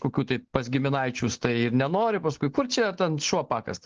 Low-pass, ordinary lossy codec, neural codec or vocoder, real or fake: 7.2 kHz; Opus, 16 kbps; none; real